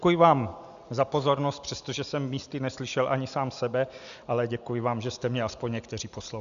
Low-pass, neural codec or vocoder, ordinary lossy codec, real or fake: 7.2 kHz; none; MP3, 96 kbps; real